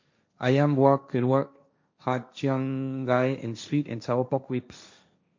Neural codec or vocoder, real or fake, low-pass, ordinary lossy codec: codec, 16 kHz, 1.1 kbps, Voila-Tokenizer; fake; 7.2 kHz; MP3, 48 kbps